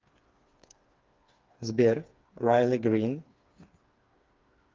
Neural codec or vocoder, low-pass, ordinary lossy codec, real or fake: codec, 16 kHz, 4 kbps, FreqCodec, smaller model; 7.2 kHz; Opus, 32 kbps; fake